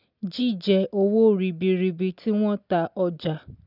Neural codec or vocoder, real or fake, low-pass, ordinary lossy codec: none; real; 5.4 kHz; none